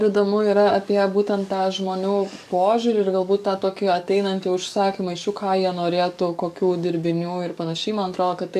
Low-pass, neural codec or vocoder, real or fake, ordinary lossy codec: 14.4 kHz; codec, 44.1 kHz, 7.8 kbps, DAC; fake; AAC, 96 kbps